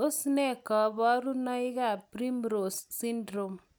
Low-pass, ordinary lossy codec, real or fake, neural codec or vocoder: none; none; real; none